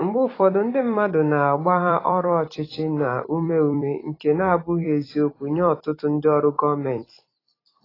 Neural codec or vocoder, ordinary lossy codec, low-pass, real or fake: vocoder, 44.1 kHz, 128 mel bands every 256 samples, BigVGAN v2; AAC, 24 kbps; 5.4 kHz; fake